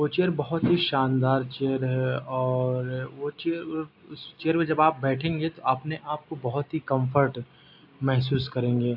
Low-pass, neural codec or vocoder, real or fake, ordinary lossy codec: 5.4 kHz; none; real; AAC, 48 kbps